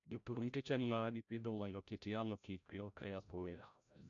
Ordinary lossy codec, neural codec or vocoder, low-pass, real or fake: none; codec, 16 kHz, 0.5 kbps, FreqCodec, larger model; 7.2 kHz; fake